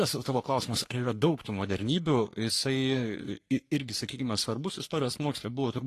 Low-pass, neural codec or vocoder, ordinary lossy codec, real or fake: 14.4 kHz; codec, 44.1 kHz, 3.4 kbps, Pupu-Codec; AAC, 48 kbps; fake